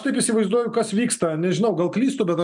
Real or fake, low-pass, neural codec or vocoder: real; 10.8 kHz; none